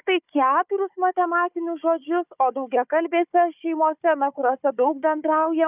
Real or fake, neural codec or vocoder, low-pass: fake; codec, 16 kHz, 4 kbps, FunCodec, trained on Chinese and English, 50 frames a second; 3.6 kHz